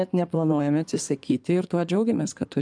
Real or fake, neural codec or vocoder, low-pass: fake; codec, 16 kHz in and 24 kHz out, 2.2 kbps, FireRedTTS-2 codec; 9.9 kHz